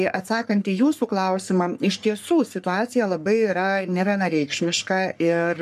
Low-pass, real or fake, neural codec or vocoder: 14.4 kHz; fake; codec, 44.1 kHz, 3.4 kbps, Pupu-Codec